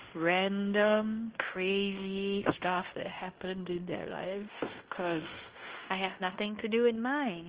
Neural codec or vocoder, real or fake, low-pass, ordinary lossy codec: codec, 16 kHz in and 24 kHz out, 0.9 kbps, LongCat-Audio-Codec, fine tuned four codebook decoder; fake; 3.6 kHz; Opus, 16 kbps